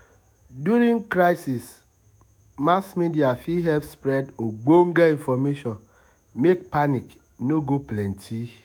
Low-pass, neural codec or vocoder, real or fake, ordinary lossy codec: none; autoencoder, 48 kHz, 128 numbers a frame, DAC-VAE, trained on Japanese speech; fake; none